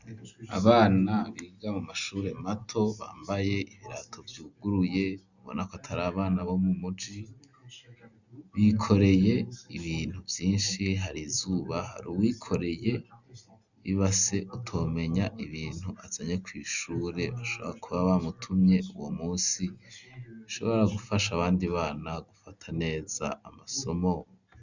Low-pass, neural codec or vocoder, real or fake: 7.2 kHz; none; real